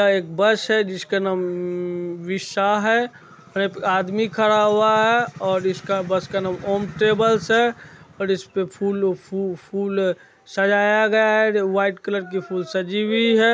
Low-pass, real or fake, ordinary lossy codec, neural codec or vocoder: none; real; none; none